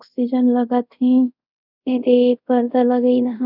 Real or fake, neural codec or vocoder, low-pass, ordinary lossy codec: fake; codec, 24 kHz, 0.5 kbps, DualCodec; 5.4 kHz; none